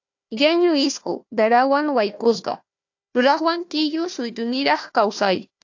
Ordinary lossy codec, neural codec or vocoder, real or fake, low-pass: AAC, 48 kbps; codec, 16 kHz, 1 kbps, FunCodec, trained on Chinese and English, 50 frames a second; fake; 7.2 kHz